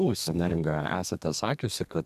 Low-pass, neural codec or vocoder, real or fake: 14.4 kHz; codec, 32 kHz, 1.9 kbps, SNAC; fake